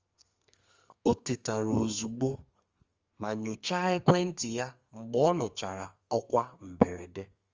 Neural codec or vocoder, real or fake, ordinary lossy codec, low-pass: codec, 32 kHz, 1.9 kbps, SNAC; fake; Opus, 32 kbps; 7.2 kHz